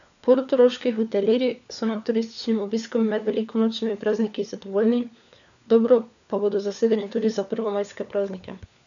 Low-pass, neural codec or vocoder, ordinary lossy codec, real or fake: 7.2 kHz; codec, 16 kHz, 4 kbps, FunCodec, trained on LibriTTS, 50 frames a second; none; fake